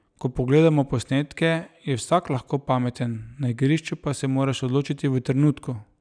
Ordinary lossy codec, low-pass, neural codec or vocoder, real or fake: none; 9.9 kHz; none; real